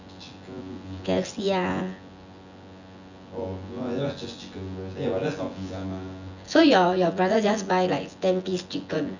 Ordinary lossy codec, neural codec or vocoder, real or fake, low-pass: none; vocoder, 24 kHz, 100 mel bands, Vocos; fake; 7.2 kHz